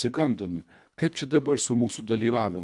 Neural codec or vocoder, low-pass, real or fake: codec, 24 kHz, 1.5 kbps, HILCodec; 10.8 kHz; fake